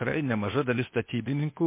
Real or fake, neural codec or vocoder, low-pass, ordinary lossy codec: fake; codec, 16 kHz, 0.8 kbps, ZipCodec; 3.6 kHz; MP3, 24 kbps